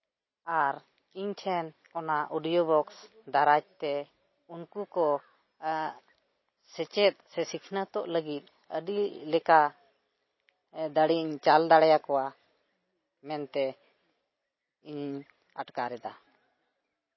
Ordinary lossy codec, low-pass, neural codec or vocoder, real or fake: MP3, 24 kbps; 7.2 kHz; none; real